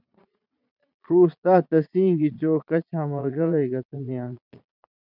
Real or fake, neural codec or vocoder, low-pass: fake; vocoder, 22.05 kHz, 80 mel bands, Vocos; 5.4 kHz